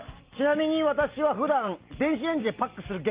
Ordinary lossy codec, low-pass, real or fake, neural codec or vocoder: Opus, 32 kbps; 3.6 kHz; real; none